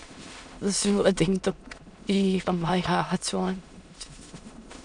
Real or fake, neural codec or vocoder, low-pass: fake; autoencoder, 22.05 kHz, a latent of 192 numbers a frame, VITS, trained on many speakers; 9.9 kHz